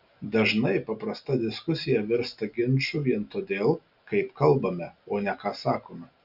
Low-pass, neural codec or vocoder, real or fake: 5.4 kHz; none; real